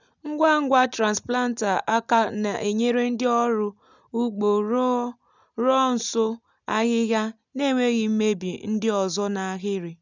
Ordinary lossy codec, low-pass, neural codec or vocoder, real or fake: none; 7.2 kHz; none; real